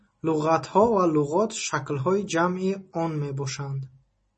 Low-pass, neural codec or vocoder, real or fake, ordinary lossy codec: 10.8 kHz; none; real; MP3, 32 kbps